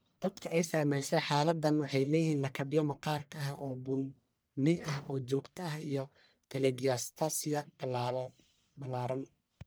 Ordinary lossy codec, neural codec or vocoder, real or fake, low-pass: none; codec, 44.1 kHz, 1.7 kbps, Pupu-Codec; fake; none